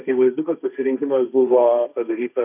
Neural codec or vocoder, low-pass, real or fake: codec, 16 kHz, 1.1 kbps, Voila-Tokenizer; 3.6 kHz; fake